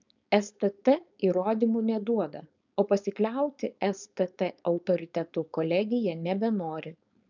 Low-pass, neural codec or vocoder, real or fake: 7.2 kHz; codec, 16 kHz, 4.8 kbps, FACodec; fake